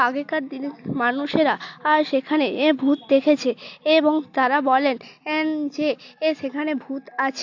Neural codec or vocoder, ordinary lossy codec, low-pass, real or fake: none; AAC, 48 kbps; 7.2 kHz; real